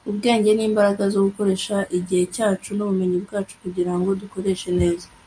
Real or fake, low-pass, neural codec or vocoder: real; 9.9 kHz; none